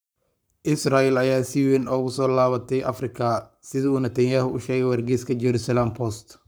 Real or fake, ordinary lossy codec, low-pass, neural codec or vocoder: fake; none; none; codec, 44.1 kHz, 7.8 kbps, Pupu-Codec